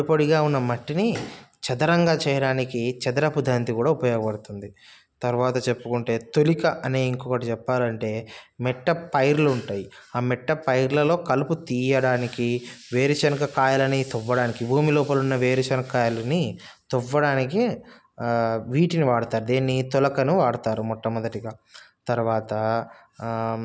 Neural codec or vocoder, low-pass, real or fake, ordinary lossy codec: none; none; real; none